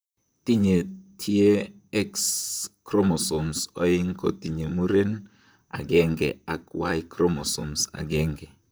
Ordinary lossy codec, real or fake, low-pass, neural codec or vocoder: none; fake; none; vocoder, 44.1 kHz, 128 mel bands, Pupu-Vocoder